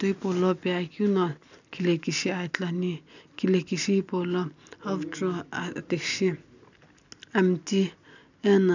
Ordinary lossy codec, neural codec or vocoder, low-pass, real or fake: none; none; 7.2 kHz; real